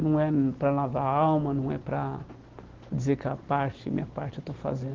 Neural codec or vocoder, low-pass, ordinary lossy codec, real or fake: none; 7.2 kHz; Opus, 16 kbps; real